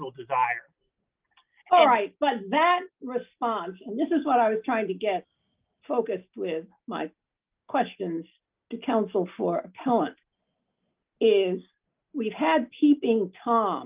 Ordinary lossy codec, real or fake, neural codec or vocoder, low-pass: Opus, 24 kbps; real; none; 3.6 kHz